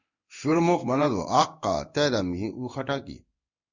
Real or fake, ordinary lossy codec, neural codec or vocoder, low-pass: fake; Opus, 64 kbps; codec, 16 kHz in and 24 kHz out, 1 kbps, XY-Tokenizer; 7.2 kHz